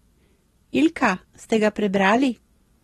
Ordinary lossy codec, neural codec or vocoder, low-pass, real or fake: AAC, 32 kbps; none; 19.8 kHz; real